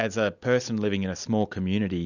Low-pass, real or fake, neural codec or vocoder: 7.2 kHz; real; none